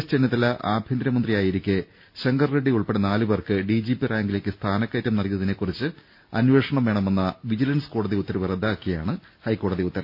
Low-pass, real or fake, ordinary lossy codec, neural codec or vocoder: 5.4 kHz; real; none; none